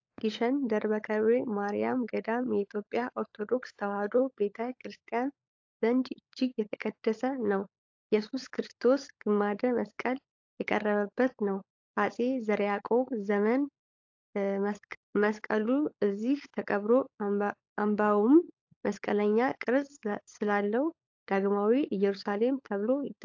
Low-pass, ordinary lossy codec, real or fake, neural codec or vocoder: 7.2 kHz; AAC, 48 kbps; fake; codec, 16 kHz, 16 kbps, FunCodec, trained on LibriTTS, 50 frames a second